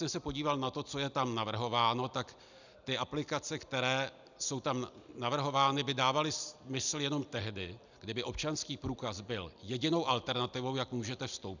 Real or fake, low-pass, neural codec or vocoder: real; 7.2 kHz; none